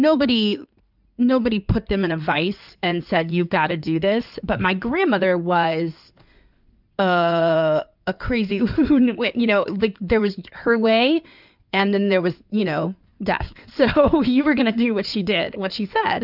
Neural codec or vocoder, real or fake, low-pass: codec, 16 kHz in and 24 kHz out, 2.2 kbps, FireRedTTS-2 codec; fake; 5.4 kHz